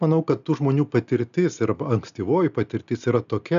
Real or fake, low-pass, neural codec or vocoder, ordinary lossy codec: real; 7.2 kHz; none; MP3, 96 kbps